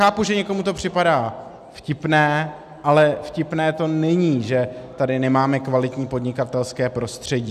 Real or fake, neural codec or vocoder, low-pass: real; none; 14.4 kHz